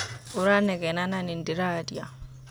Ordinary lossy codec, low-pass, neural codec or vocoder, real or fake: none; none; none; real